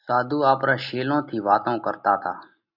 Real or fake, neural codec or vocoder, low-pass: real; none; 5.4 kHz